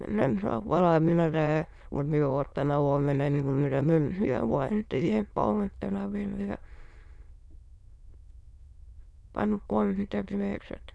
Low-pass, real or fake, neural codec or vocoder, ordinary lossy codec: none; fake; autoencoder, 22.05 kHz, a latent of 192 numbers a frame, VITS, trained on many speakers; none